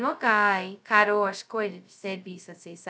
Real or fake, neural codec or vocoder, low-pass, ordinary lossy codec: fake; codec, 16 kHz, 0.2 kbps, FocalCodec; none; none